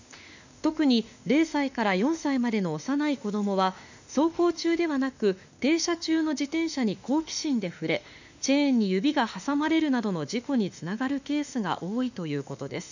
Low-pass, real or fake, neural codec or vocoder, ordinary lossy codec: 7.2 kHz; fake; autoencoder, 48 kHz, 32 numbers a frame, DAC-VAE, trained on Japanese speech; none